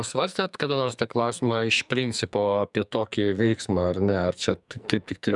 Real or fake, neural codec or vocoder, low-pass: fake; codec, 44.1 kHz, 2.6 kbps, SNAC; 10.8 kHz